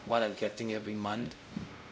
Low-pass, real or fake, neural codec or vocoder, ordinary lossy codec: none; fake; codec, 16 kHz, 0.5 kbps, X-Codec, WavLM features, trained on Multilingual LibriSpeech; none